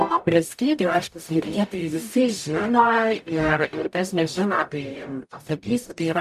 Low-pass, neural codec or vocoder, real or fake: 14.4 kHz; codec, 44.1 kHz, 0.9 kbps, DAC; fake